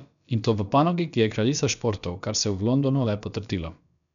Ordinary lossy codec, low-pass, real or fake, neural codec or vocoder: none; 7.2 kHz; fake; codec, 16 kHz, about 1 kbps, DyCAST, with the encoder's durations